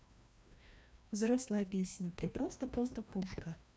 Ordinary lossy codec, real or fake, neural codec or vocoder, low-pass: none; fake; codec, 16 kHz, 1 kbps, FreqCodec, larger model; none